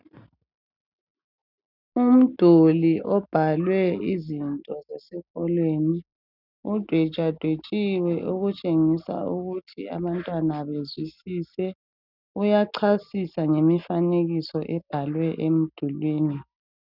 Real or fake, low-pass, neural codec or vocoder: real; 5.4 kHz; none